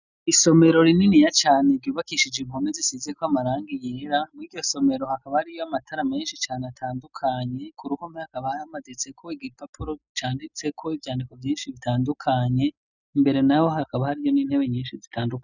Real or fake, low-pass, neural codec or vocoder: real; 7.2 kHz; none